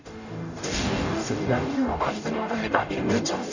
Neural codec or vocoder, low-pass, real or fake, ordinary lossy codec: codec, 44.1 kHz, 0.9 kbps, DAC; 7.2 kHz; fake; none